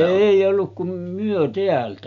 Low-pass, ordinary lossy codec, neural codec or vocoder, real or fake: 7.2 kHz; none; none; real